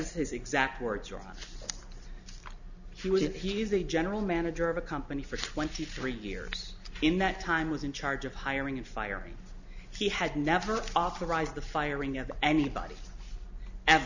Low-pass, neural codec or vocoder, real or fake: 7.2 kHz; none; real